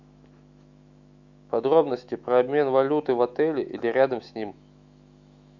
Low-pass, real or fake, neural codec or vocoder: 7.2 kHz; fake; autoencoder, 48 kHz, 128 numbers a frame, DAC-VAE, trained on Japanese speech